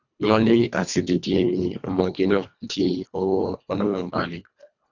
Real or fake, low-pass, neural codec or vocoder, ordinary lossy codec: fake; 7.2 kHz; codec, 24 kHz, 1.5 kbps, HILCodec; none